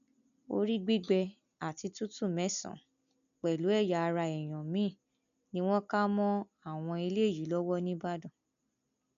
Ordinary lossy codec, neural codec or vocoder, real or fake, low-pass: none; none; real; 7.2 kHz